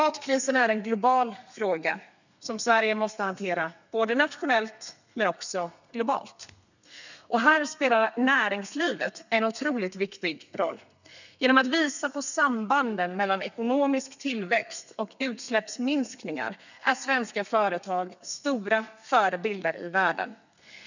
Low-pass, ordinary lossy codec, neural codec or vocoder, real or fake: 7.2 kHz; none; codec, 32 kHz, 1.9 kbps, SNAC; fake